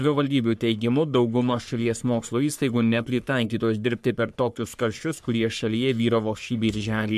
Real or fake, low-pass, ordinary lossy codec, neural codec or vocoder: fake; 14.4 kHz; MP3, 96 kbps; codec, 44.1 kHz, 3.4 kbps, Pupu-Codec